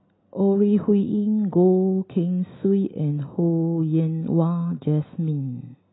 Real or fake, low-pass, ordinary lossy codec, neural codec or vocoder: real; 7.2 kHz; AAC, 16 kbps; none